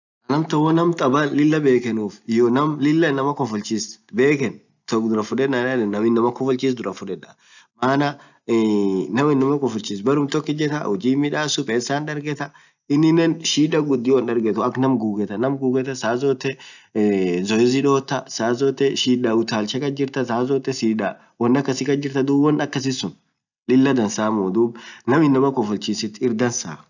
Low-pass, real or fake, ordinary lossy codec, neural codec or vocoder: 7.2 kHz; real; none; none